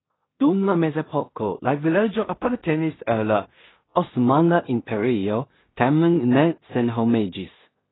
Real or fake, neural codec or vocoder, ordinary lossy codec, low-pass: fake; codec, 16 kHz in and 24 kHz out, 0.4 kbps, LongCat-Audio-Codec, two codebook decoder; AAC, 16 kbps; 7.2 kHz